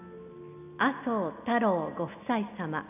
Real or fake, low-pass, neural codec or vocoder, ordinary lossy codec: real; 3.6 kHz; none; none